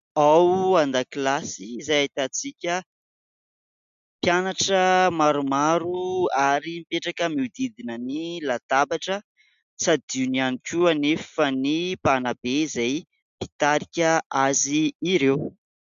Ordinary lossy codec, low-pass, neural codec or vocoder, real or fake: MP3, 64 kbps; 7.2 kHz; none; real